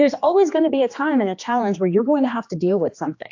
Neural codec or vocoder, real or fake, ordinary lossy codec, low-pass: codec, 16 kHz, 2 kbps, X-Codec, HuBERT features, trained on general audio; fake; AAC, 48 kbps; 7.2 kHz